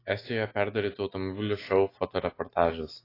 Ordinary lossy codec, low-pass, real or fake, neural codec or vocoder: AAC, 24 kbps; 5.4 kHz; real; none